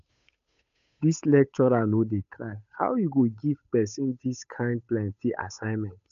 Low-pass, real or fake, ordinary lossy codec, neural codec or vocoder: 7.2 kHz; fake; none; codec, 16 kHz, 8 kbps, FunCodec, trained on Chinese and English, 25 frames a second